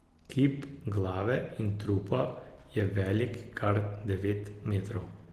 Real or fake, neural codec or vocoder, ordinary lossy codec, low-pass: real; none; Opus, 16 kbps; 14.4 kHz